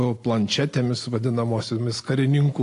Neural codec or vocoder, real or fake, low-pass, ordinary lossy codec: none; real; 10.8 kHz; AAC, 48 kbps